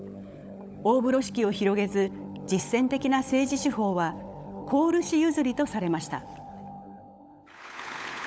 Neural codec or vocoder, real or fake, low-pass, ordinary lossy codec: codec, 16 kHz, 16 kbps, FunCodec, trained on LibriTTS, 50 frames a second; fake; none; none